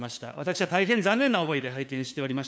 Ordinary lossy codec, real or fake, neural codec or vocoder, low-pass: none; fake; codec, 16 kHz, 2 kbps, FunCodec, trained on LibriTTS, 25 frames a second; none